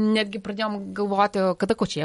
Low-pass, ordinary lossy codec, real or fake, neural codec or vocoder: 14.4 kHz; MP3, 48 kbps; real; none